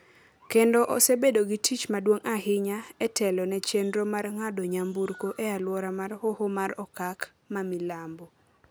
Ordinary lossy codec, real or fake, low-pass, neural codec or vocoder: none; real; none; none